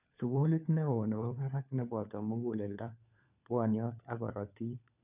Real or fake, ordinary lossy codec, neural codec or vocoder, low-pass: fake; none; codec, 16 kHz, 4 kbps, FunCodec, trained on LibriTTS, 50 frames a second; 3.6 kHz